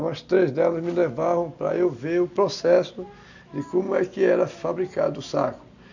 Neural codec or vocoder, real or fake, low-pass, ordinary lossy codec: none; real; 7.2 kHz; none